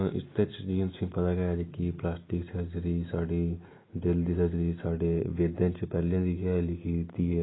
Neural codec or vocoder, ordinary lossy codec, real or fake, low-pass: none; AAC, 16 kbps; real; 7.2 kHz